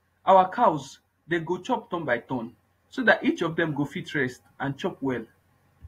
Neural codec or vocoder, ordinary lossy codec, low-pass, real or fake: vocoder, 48 kHz, 128 mel bands, Vocos; AAC, 48 kbps; 19.8 kHz; fake